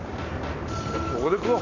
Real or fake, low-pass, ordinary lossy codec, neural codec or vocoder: real; 7.2 kHz; none; none